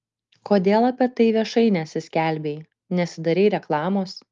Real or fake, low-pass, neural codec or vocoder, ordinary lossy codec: real; 7.2 kHz; none; Opus, 32 kbps